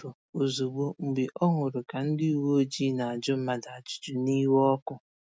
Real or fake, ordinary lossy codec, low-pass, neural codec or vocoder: real; none; none; none